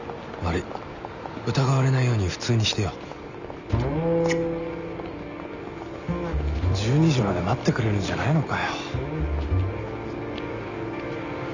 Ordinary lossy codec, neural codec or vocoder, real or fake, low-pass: none; none; real; 7.2 kHz